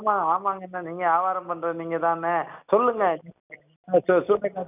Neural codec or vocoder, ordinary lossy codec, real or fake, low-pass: none; none; real; 3.6 kHz